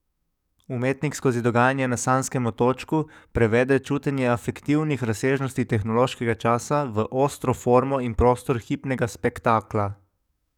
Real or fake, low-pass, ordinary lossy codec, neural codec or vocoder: fake; 19.8 kHz; none; codec, 44.1 kHz, 7.8 kbps, DAC